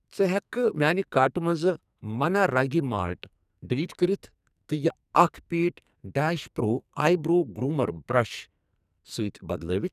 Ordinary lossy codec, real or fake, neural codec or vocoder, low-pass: none; fake; codec, 44.1 kHz, 2.6 kbps, SNAC; 14.4 kHz